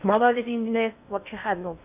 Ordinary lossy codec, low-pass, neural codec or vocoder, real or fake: none; 3.6 kHz; codec, 16 kHz in and 24 kHz out, 0.6 kbps, FocalCodec, streaming, 4096 codes; fake